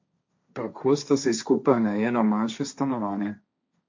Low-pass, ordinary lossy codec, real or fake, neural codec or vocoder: 7.2 kHz; MP3, 48 kbps; fake; codec, 16 kHz, 1.1 kbps, Voila-Tokenizer